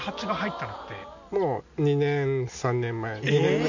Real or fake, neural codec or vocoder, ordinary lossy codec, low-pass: real; none; none; 7.2 kHz